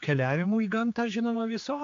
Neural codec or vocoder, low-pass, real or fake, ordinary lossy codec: codec, 16 kHz, 4 kbps, X-Codec, HuBERT features, trained on general audio; 7.2 kHz; fake; AAC, 48 kbps